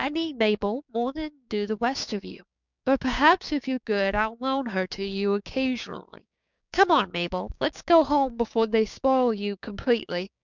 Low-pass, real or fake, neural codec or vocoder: 7.2 kHz; fake; codec, 16 kHz, 6 kbps, DAC